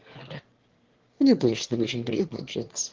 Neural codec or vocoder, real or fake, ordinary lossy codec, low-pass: autoencoder, 22.05 kHz, a latent of 192 numbers a frame, VITS, trained on one speaker; fake; Opus, 16 kbps; 7.2 kHz